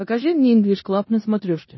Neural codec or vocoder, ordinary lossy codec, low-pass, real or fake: codec, 16 kHz in and 24 kHz out, 0.9 kbps, LongCat-Audio-Codec, four codebook decoder; MP3, 24 kbps; 7.2 kHz; fake